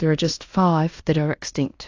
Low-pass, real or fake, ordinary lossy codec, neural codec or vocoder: 7.2 kHz; fake; AAC, 48 kbps; codec, 16 kHz in and 24 kHz out, 0.9 kbps, LongCat-Audio-Codec, fine tuned four codebook decoder